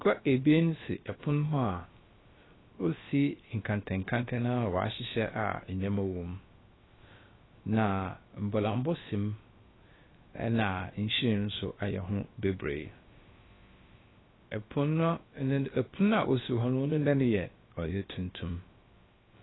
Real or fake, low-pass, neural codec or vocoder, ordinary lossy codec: fake; 7.2 kHz; codec, 16 kHz, about 1 kbps, DyCAST, with the encoder's durations; AAC, 16 kbps